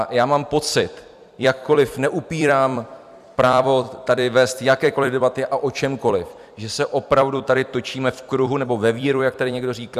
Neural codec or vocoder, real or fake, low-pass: vocoder, 44.1 kHz, 128 mel bands every 256 samples, BigVGAN v2; fake; 14.4 kHz